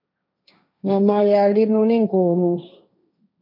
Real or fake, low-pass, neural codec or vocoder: fake; 5.4 kHz; codec, 16 kHz, 1.1 kbps, Voila-Tokenizer